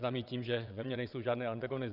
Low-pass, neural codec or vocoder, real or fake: 5.4 kHz; vocoder, 22.05 kHz, 80 mel bands, WaveNeXt; fake